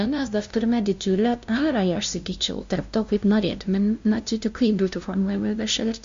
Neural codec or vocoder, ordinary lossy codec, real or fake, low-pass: codec, 16 kHz, 0.5 kbps, FunCodec, trained on LibriTTS, 25 frames a second; MP3, 48 kbps; fake; 7.2 kHz